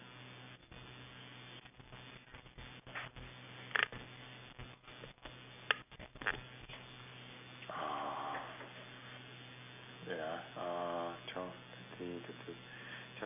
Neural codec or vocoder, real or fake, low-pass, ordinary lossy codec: none; real; 3.6 kHz; none